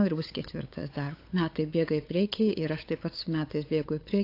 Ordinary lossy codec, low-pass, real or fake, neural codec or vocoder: AAC, 32 kbps; 5.4 kHz; fake; codec, 16 kHz, 8 kbps, FunCodec, trained on LibriTTS, 25 frames a second